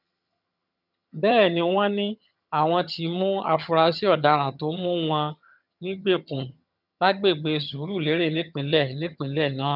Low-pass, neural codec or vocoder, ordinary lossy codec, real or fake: 5.4 kHz; vocoder, 22.05 kHz, 80 mel bands, HiFi-GAN; none; fake